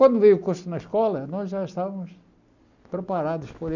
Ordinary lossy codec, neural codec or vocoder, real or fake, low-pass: none; none; real; 7.2 kHz